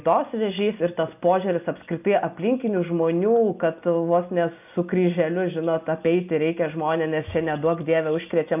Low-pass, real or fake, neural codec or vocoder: 3.6 kHz; real; none